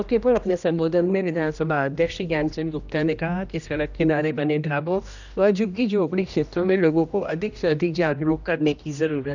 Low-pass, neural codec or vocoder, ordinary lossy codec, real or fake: 7.2 kHz; codec, 16 kHz, 1 kbps, X-Codec, HuBERT features, trained on general audio; none; fake